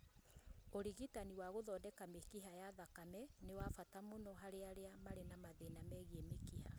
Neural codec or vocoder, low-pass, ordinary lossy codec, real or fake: none; none; none; real